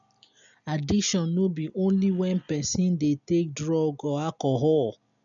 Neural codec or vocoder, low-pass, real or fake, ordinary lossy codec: none; 7.2 kHz; real; none